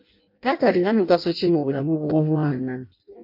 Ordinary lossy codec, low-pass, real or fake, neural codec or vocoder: MP3, 32 kbps; 5.4 kHz; fake; codec, 16 kHz in and 24 kHz out, 0.6 kbps, FireRedTTS-2 codec